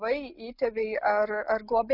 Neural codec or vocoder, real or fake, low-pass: none; real; 5.4 kHz